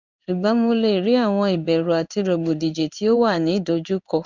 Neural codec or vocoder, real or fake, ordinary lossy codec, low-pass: codec, 16 kHz in and 24 kHz out, 1 kbps, XY-Tokenizer; fake; none; 7.2 kHz